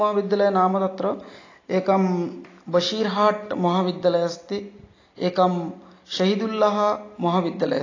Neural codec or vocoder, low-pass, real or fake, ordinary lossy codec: none; 7.2 kHz; real; AAC, 32 kbps